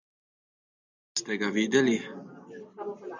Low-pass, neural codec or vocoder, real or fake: 7.2 kHz; none; real